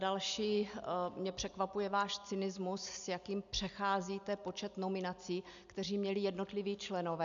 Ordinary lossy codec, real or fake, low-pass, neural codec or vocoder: MP3, 96 kbps; real; 7.2 kHz; none